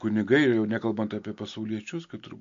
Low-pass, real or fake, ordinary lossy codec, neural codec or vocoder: 7.2 kHz; real; MP3, 48 kbps; none